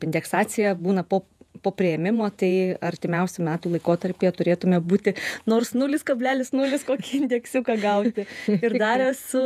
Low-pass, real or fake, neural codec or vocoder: 14.4 kHz; fake; vocoder, 48 kHz, 128 mel bands, Vocos